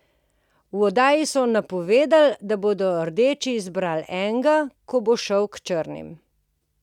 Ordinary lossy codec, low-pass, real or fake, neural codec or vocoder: none; 19.8 kHz; real; none